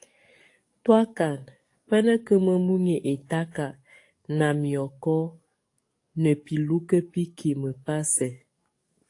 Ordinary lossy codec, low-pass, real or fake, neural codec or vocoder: AAC, 48 kbps; 10.8 kHz; fake; codec, 44.1 kHz, 7.8 kbps, DAC